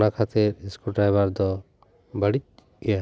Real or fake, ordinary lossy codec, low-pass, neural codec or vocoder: real; none; none; none